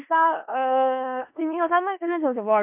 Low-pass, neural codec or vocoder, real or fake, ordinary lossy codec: 3.6 kHz; codec, 16 kHz in and 24 kHz out, 0.4 kbps, LongCat-Audio-Codec, four codebook decoder; fake; MP3, 32 kbps